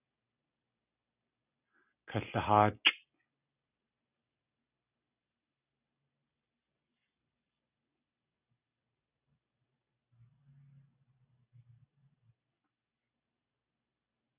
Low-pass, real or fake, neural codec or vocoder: 3.6 kHz; real; none